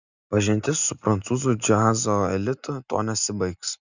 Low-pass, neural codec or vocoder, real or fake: 7.2 kHz; none; real